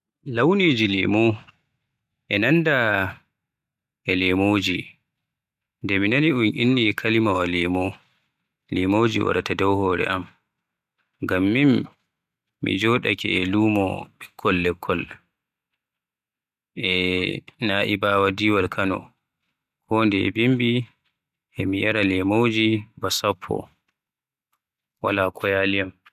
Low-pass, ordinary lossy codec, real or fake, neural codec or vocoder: 14.4 kHz; none; real; none